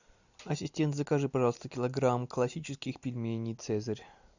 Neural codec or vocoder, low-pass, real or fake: none; 7.2 kHz; real